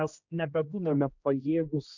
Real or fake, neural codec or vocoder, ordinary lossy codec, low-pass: fake; codec, 16 kHz, 1 kbps, X-Codec, HuBERT features, trained on general audio; MP3, 64 kbps; 7.2 kHz